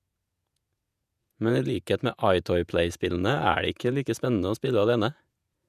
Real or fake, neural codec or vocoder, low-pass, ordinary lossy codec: fake; vocoder, 48 kHz, 128 mel bands, Vocos; 14.4 kHz; none